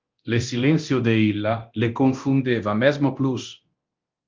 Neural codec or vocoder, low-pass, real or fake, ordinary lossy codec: codec, 24 kHz, 0.9 kbps, DualCodec; 7.2 kHz; fake; Opus, 24 kbps